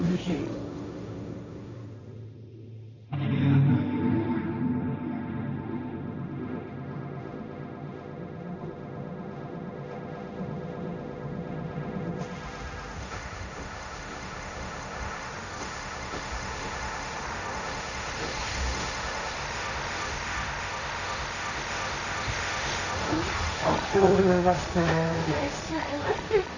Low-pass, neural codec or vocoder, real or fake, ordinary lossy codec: 7.2 kHz; codec, 16 kHz, 1.1 kbps, Voila-Tokenizer; fake; none